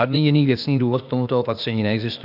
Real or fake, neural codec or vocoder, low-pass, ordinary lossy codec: fake; codec, 16 kHz, 0.8 kbps, ZipCodec; 5.4 kHz; AAC, 48 kbps